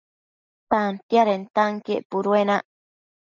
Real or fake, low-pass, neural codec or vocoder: real; 7.2 kHz; none